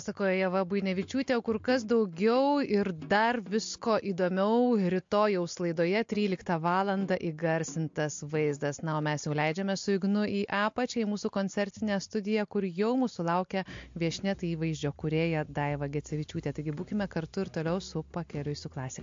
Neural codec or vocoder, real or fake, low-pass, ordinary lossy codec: none; real; 7.2 kHz; MP3, 48 kbps